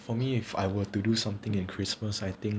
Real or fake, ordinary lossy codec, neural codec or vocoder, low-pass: real; none; none; none